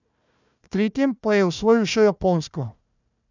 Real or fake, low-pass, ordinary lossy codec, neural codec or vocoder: fake; 7.2 kHz; none; codec, 16 kHz, 1 kbps, FunCodec, trained on Chinese and English, 50 frames a second